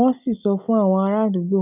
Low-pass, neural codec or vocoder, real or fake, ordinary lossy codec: 3.6 kHz; none; real; none